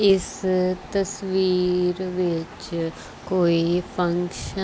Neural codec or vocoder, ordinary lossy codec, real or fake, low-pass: none; none; real; none